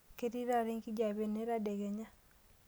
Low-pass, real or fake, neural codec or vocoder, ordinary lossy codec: none; real; none; none